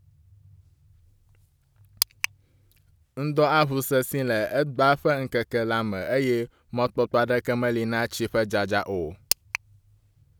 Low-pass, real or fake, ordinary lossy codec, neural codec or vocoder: none; real; none; none